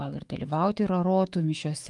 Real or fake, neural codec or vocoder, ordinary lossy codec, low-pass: fake; autoencoder, 48 kHz, 128 numbers a frame, DAC-VAE, trained on Japanese speech; Opus, 32 kbps; 10.8 kHz